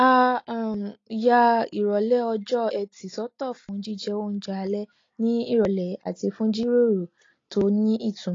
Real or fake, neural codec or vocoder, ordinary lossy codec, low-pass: real; none; AAC, 32 kbps; 7.2 kHz